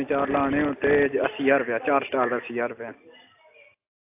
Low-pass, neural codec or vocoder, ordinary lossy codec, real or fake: 3.6 kHz; none; AAC, 32 kbps; real